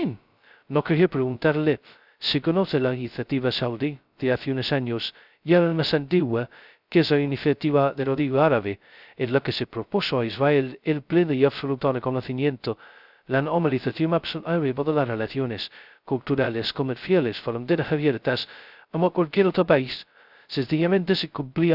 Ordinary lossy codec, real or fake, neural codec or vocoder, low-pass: none; fake; codec, 16 kHz, 0.2 kbps, FocalCodec; 5.4 kHz